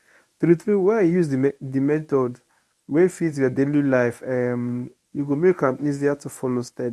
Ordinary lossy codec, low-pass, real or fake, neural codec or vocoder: none; none; fake; codec, 24 kHz, 0.9 kbps, WavTokenizer, medium speech release version 1